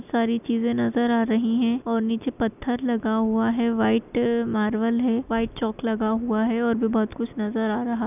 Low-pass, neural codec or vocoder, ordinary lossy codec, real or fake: 3.6 kHz; none; none; real